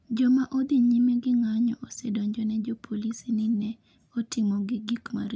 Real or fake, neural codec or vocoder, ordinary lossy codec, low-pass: real; none; none; none